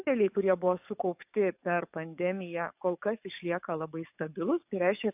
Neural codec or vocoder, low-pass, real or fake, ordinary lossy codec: codec, 44.1 kHz, 7.8 kbps, DAC; 3.6 kHz; fake; AAC, 32 kbps